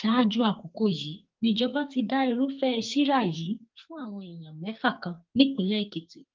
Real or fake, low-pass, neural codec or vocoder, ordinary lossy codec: fake; 7.2 kHz; codec, 44.1 kHz, 2.6 kbps, SNAC; Opus, 32 kbps